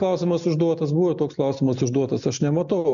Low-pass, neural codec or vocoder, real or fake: 7.2 kHz; none; real